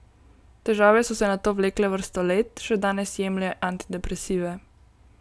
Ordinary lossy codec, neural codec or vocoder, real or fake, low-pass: none; none; real; none